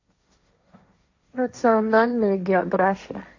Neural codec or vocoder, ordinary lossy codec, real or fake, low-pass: codec, 16 kHz, 1.1 kbps, Voila-Tokenizer; AAC, 48 kbps; fake; 7.2 kHz